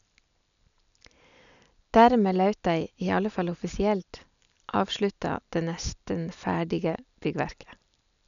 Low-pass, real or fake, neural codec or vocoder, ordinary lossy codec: 7.2 kHz; real; none; none